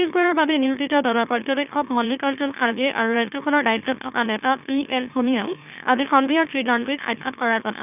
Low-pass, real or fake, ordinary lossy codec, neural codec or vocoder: 3.6 kHz; fake; none; autoencoder, 44.1 kHz, a latent of 192 numbers a frame, MeloTTS